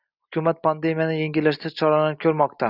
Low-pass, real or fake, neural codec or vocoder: 5.4 kHz; real; none